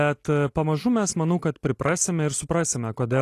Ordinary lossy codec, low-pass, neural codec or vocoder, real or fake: AAC, 48 kbps; 14.4 kHz; none; real